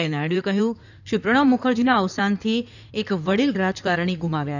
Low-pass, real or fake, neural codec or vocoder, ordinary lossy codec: 7.2 kHz; fake; codec, 16 kHz in and 24 kHz out, 2.2 kbps, FireRedTTS-2 codec; none